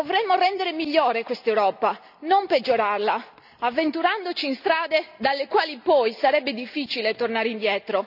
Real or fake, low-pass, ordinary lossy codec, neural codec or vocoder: real; 5.4 kHz; none; none